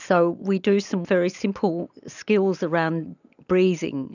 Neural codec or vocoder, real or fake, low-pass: none; real; 7.2 kHz